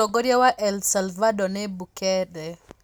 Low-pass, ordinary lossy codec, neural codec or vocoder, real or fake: none; none; none; real